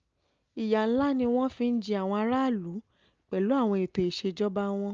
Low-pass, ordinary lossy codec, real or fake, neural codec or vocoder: 7.2 kHz; Opus, 24 kbps; real; none